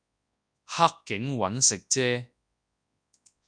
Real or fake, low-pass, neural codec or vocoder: fake; 9.9 kHz; codec, 24 kHz, 0.9 kbps, WavTokenizer, large speech release